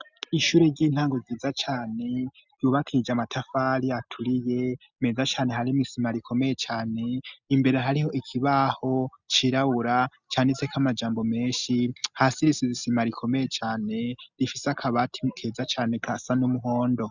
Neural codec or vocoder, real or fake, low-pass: none; real; 7.2 kHz